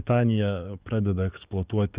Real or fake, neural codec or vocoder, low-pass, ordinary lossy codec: fake; codec, 44.1 kHz, 7.8 kbps, DAC; 3.6 kHz; Opus, 64 kbps